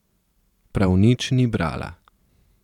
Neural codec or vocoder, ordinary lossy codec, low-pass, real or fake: vocoder, 44.1 kHz, 128 mel bands every 512 samples, BigVGAN v2; none; 19.8 kHz; fake